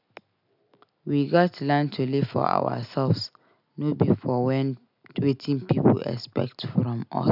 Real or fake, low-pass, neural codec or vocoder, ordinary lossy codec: real; 5.4 kHz; none; none